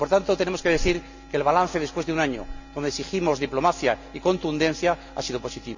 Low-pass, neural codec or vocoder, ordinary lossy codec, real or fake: 7.2 kHz; none; none; real